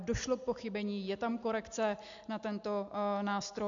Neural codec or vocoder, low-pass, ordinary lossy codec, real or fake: none; 7.2 kHz; MP3, 64 kbps; real